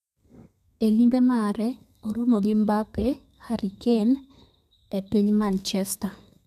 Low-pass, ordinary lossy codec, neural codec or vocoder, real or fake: 14.4 kHz; none; codec, 32 kHz, 1.9 kbps, SNAC; fake